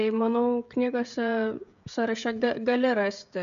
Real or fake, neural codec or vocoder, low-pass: fake; codec, 16 kHz, 16 kbps, FreqCodec, smaller model; 7.2 kHz